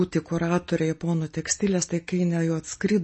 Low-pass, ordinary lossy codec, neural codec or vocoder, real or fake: 10.8 kHz; MP3, 32 kbps; none; real